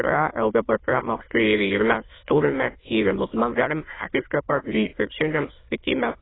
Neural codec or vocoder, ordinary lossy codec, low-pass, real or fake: autoencoder, 22.05 kHz, a latent of 192 numbers a frame, VITS, trained on many speakers; AAC, 16 kbps; 7.2 kHz; fake